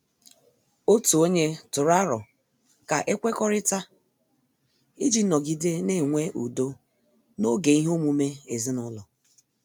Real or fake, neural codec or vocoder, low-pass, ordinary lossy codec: real; none; none; none